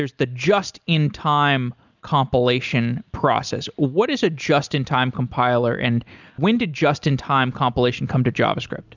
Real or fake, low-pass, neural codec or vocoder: real; 7.2 kHz; none